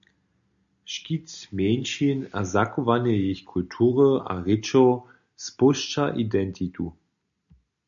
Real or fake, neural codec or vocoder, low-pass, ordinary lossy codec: real; none; 7.2 kHz; MP3, 64 kbps